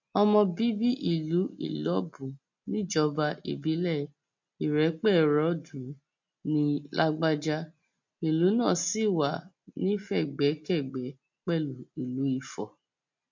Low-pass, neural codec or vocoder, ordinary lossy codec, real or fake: 7.2 kHz; none; MP3, 48 kbps; real